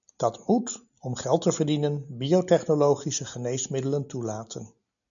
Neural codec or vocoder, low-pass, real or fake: none; 7.2 kHz; real